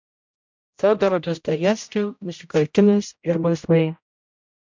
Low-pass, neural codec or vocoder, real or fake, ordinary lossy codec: 7.2 kHz; codec, 16 kHz, 0.5 kbps, X-Codec, HuBERT features, trained on general audio; fake; MP3, 64 kbps